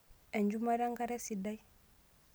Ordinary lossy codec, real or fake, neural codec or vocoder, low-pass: none; real; none; none